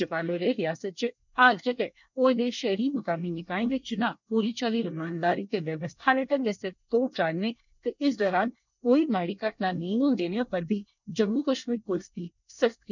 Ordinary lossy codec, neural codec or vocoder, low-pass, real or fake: none; codec, 24 kHz, 1 kbps, SNAC; 7.2 kHz; fake